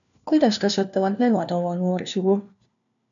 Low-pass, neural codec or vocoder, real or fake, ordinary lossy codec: 7.2 kHz; codec, 16 kHz, 1 kbps, FunCodec, trained on LibriTTS, 50 frames a second; fake; MP3, 96 kbps